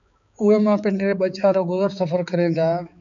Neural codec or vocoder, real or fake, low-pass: codec, 16 kHz, 4 kbps, X-Codec, HuBERT features, trained on balanced general audio; fake; 7.2 kHz